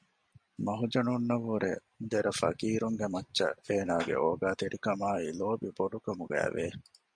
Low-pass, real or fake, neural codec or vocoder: 9.9 kHz; real; none